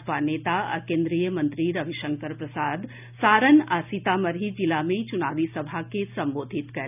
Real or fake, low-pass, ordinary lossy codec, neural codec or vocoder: real; 3.6 kHz; none; none